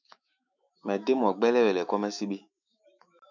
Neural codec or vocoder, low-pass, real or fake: autoencoder, 48 kHz, 128 numbers a frame, DAC-VAE, trained on Japanese speech; 7.2 kHz; fake